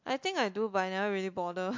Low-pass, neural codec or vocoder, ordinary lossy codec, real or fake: 7.2 kHz; none; MP3, 48 kbps; real